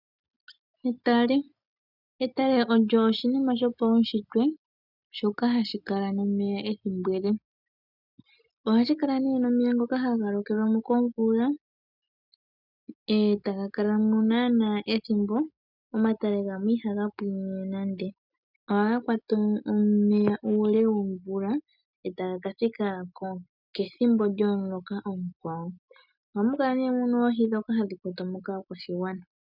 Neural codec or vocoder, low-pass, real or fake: none; 5.4 kHz; real